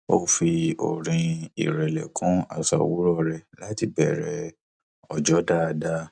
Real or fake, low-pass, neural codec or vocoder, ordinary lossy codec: real; 9.9 kHz; none; none